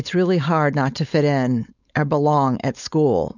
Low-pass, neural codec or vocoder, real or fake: 7.2 kHz; codec, 16 kHz, 4.8 kbps, FACodec; fake